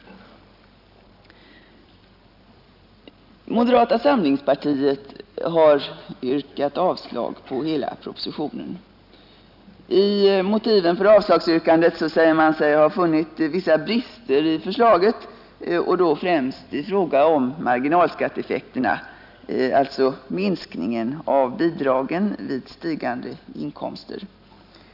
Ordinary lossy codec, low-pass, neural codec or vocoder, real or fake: none; 5.4 kHz; none; real